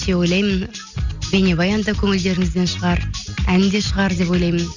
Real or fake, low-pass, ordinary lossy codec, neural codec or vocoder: real; 7.2 kHz; Opus, 64 kbps; none